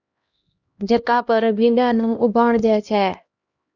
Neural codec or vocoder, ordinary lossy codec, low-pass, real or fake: codec, 16 kHz, 1 kbps, X-Codec, HuBERT features, trained on LibriSpeech; Opus, 64 kbps; 7.2 kHz; fake